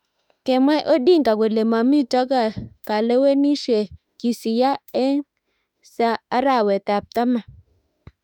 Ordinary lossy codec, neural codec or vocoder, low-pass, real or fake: none; autoencoder, 48 kHz, 32 numbers a frame, DAC-VAE, trained on Japanese speech; 19.8 kHz; fake